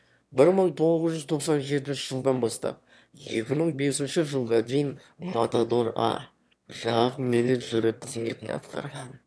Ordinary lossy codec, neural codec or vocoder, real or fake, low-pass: none; autoencoder, 22.05 kHz, a latent of 192 numbers a frame, VITS, trained on one speaker; fake; none